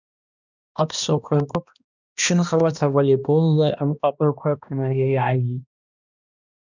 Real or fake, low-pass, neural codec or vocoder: fake; 7.2 kHz; codec, 16 kHz, 1 kbps, X-Codec, HuBERT features, trained on balanced general audio